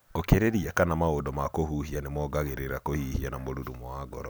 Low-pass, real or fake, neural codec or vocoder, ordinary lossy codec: none; real; none; none